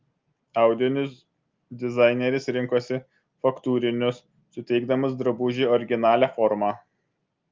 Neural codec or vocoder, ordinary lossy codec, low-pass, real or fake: none; Opus, 24 kbps; 7.2 kHz; real